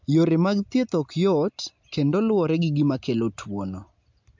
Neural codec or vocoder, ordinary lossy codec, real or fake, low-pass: none; MP3, 64 kbps; real; 7.2 kHz